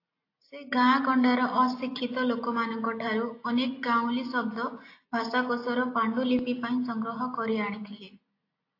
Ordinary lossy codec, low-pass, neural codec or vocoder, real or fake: AAC, 32 kbps; 5.4 kHz; none; real